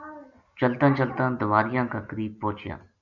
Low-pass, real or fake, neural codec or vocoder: 7.2 kHz; real; none